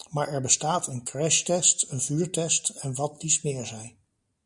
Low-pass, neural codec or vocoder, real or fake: 10.8 kHz; none; real